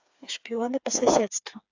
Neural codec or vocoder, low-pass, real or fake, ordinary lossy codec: codec, 16 kHz, 4 kbps, FreqCodec, smaller model; 7.2 kHz; fake; AAC, 48 kbps